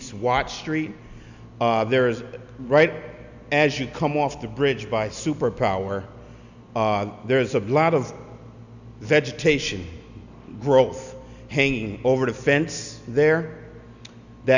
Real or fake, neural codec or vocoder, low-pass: real; none; 7.2 kHz